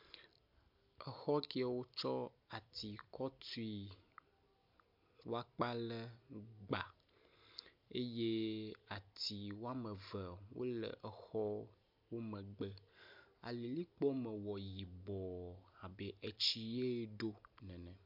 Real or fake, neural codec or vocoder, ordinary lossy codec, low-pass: real; none; MP3, 48 kbps; 5.4 kHz